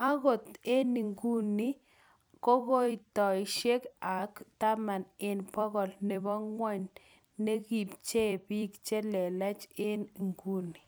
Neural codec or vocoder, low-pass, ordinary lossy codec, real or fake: vocoder, 44.1 kHz, 128 mel bands every 256 samples, BigVGAN v2; none; none; fake